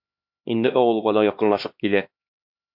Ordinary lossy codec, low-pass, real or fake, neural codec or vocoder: MP3, 48 kbps; 5.4 kHz; fake; codec, 16 kHz, 2 kbps, X-Codec, HuBERT features, trained on LibriSpeech